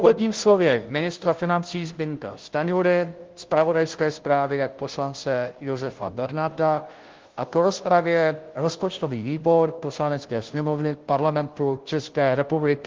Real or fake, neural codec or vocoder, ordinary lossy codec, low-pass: fake; codec, 16 kHz, 0.5 kbps, FunCodec, trained on Chinese and English, 25 frames a second; Opus, 16 kbps; 7.2 kHz